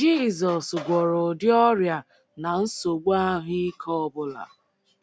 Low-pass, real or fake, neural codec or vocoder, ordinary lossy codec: none; real; none; none